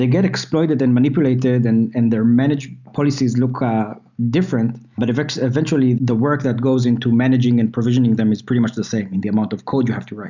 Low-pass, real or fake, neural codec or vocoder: 7.2 kHz; real; none